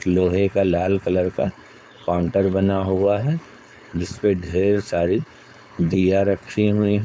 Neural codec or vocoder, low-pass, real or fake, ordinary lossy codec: codec, 16 kHz, 4.8 kbps, FACodec; none; fake; none